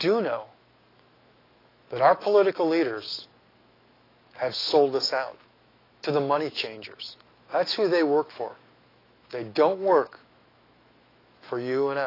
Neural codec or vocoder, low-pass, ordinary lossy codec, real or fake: autoencoder, 48 kHz, 128 numbers a frame, DAC-VAE, trained on Japanese speech; 5.4 kHz; AAC, 24 kbps; fake